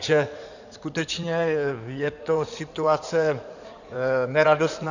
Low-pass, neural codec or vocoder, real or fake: 7.2 kHz; codec, 16 kHz in and 24 kHz out, 2.2 kbps, FireRedTTS-2 codec; fake